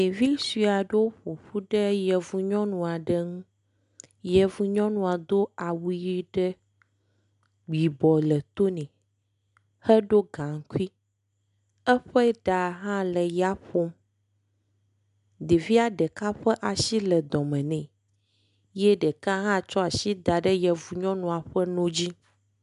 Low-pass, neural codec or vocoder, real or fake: 10.8 kHz; none; real